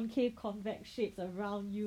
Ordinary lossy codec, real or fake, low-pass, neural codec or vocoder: none; real; 19.8 kHz; none